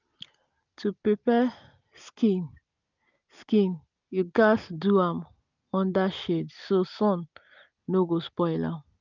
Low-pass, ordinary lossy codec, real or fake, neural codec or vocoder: 7.2 kHz; none; real; none